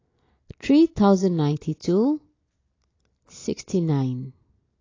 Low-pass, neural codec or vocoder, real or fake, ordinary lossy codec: 7.2 kHz; none; real; AAC, 32 kbps